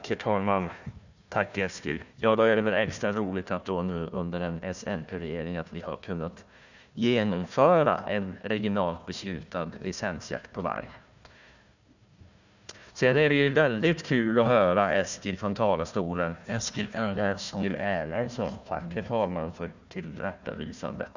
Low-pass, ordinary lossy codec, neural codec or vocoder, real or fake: 7.2 kHz; none; codec, 16 kHz, 1 kbps, FunCodec, trained on Chinese and English, 50 frames a second; fake